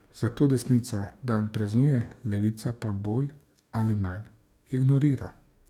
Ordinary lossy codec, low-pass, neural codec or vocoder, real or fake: none; 19.8 kHz; codec, 44.1 kHz, 2.6 kbps, DAC; fake